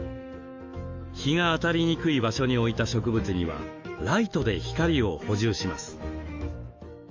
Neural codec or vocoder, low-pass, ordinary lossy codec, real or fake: autoencoder, 48 kHz, 128 numbers a frame, DAC-VAE, trained on Japanese speech; 7.2 kHz; Opus, 32 kbps; fake